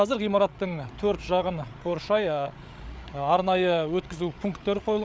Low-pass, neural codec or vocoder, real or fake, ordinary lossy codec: none; codec, 16 kHz, 8 kbps, FreqCodec, larger model; fake; none